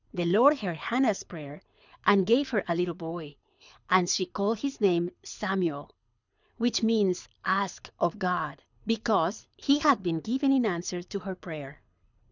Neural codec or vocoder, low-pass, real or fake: codec, 24 kHz, 6 kbps, HILCodec; 7.2 kHz; fake